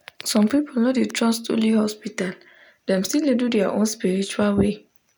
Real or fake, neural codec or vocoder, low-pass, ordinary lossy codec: real; none; none; none